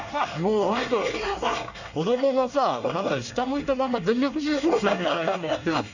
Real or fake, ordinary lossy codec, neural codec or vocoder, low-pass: fake; none; codec, 24 kHz, 1 kbps, SNAC; 7.2 kHz